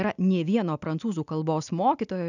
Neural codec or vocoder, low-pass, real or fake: none; 7.2 kHz; real